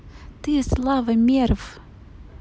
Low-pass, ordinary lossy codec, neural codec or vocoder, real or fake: none; none; none; real